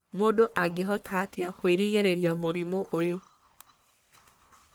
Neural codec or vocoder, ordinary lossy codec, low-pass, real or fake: codec, 44.1 kHz, 1.7 kbps, Pupu-Codec; none; none; fake